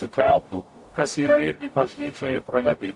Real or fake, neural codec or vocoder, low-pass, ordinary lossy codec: fake; codec, 44.1 kHz, 0.9 kbps, DAC; 10.8 kHz; MP3, 64 kbps